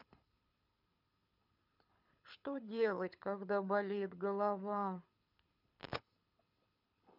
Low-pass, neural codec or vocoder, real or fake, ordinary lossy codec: 5.4 kHz; codec, 24 kHz, 6 kbps, HILCodec; fake; none